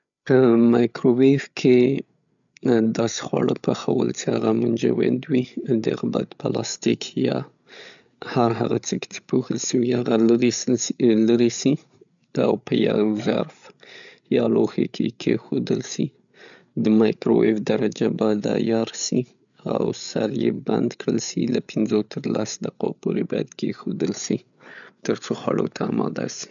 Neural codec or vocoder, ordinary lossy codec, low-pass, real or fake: codec, 16 kHz, 8 kbps, FreqCodec, larger model; none; 7.2 kHz; fake